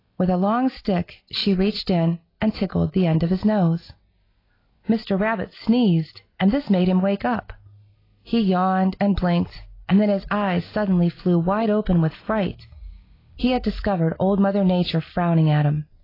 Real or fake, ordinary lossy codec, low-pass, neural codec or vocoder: real; AAC, 24 kbps; 5.4 kHz; none